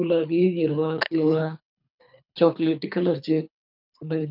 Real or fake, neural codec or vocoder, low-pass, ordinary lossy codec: fake; codec, 24 kHz, 3 kbps, HILCodec; 5.4 kHz; none